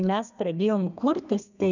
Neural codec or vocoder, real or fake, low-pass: codec, 32 kHz, 1.9 kbps, SNAC; fake; 7.2 kHz